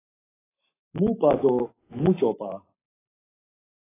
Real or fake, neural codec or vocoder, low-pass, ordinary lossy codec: fake; codec, 44.1 kHz, 7.8 kbps, Pupu-Codec; 3.6 kHz; AAC, 16 kbps